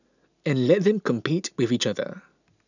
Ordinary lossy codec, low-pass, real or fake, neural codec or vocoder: none; 7.2 kHz; real; none